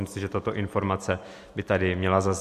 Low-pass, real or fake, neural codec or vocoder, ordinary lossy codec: 14.4 kHz; real; none; MP3, 64 kbps